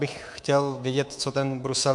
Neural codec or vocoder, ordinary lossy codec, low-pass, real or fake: codec, 24 kHz, 3.1 kbps, DualCodec; MP3, 64 kbps; 10.8 kHz; fake